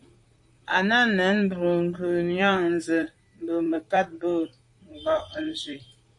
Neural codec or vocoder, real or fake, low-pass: vocoder, 44.1 kHz, 128 mel bands, Pupu-Vocoder; fake; 10.8 kHz